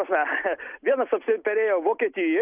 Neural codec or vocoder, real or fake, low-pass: none; real; 3.6 kHz